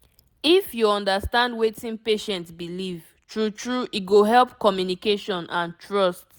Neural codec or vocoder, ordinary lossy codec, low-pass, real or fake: none; none; none; real